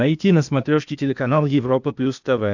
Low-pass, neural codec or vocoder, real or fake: 7.2 kHz; codec, 16 kHz, 0.8 kbps, ZipCodec; fake